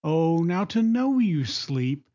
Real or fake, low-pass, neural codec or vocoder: real; 7.2 kHz; none